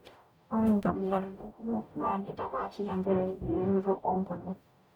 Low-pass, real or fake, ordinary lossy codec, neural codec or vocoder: 19.8 kHz; fake; none; codec, 44.1 kHz, 0.9 kbps, DAC